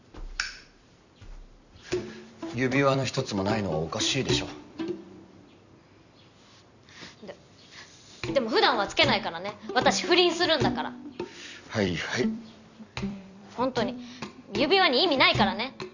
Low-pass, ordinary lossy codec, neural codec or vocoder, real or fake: 7.2 kHz; none; vocoder, 44.1 kHz, 128 mel bands every 256 samples, BigVGAN v2; fake